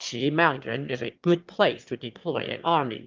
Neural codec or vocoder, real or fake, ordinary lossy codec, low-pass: autoencoder, 22.05 kHz, a latent of 192 numbers a frame, VITS, trained on one speaker; fake; Opus, 32 kbps; 7.2 kHz